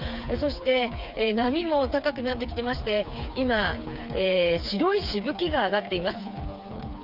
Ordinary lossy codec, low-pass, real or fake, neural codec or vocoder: none; 5.4 kHz; fake; codec, 16 kHz, 4 kbps, FreqCodec, smaller model